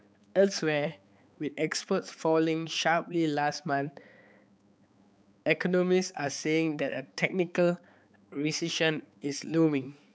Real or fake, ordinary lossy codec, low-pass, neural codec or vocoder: fake; none; none; codec, 16 kHz, 4 kbps, X-Codec, HuBERT features, trained on balanced general audio